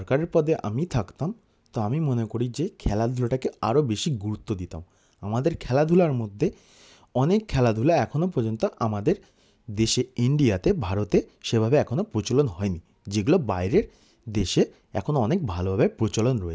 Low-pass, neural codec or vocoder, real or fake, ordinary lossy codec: none; none; real; none